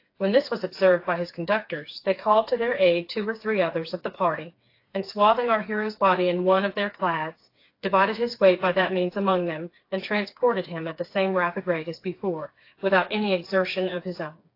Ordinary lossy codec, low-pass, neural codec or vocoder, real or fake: AAC, 32 kbps; 5.4 kHz; codec, 16 kHz, 4 kbps, FreqCodec, smaller model; fake